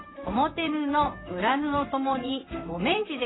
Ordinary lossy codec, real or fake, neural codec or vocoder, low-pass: AAC, 16 kbps; fake; vocoder, 44.1 kHz, 128 mel bands, Pupu-Vocoder; 7.2 kHz